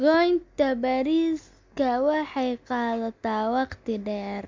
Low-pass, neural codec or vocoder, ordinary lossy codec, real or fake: 7.2 kHz; none; MP3, 64 kbps; real